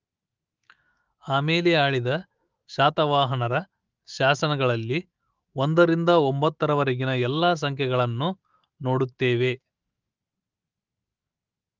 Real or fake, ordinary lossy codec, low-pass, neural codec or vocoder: fake; Opus, 24 kbps; 7.2 kHz; autoencoder, 48 kHz, 128 numbers a frame, DAC-VAE, trained on Japanese speech